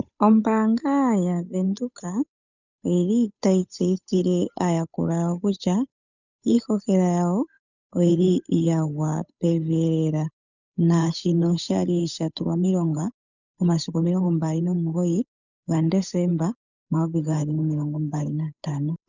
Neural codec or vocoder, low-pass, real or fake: codec, 16 kHz, 8 kbps, FunCodec, trained on Chinese and English, 25 frames a second; 7.2 kHz; fake